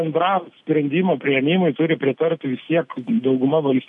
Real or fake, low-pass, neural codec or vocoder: real; 10.8 kHz; none